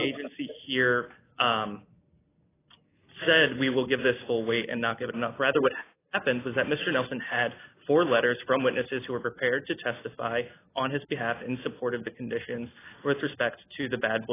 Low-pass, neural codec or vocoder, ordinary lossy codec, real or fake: 3.6 kHz; none; AAC, 16 kbps; real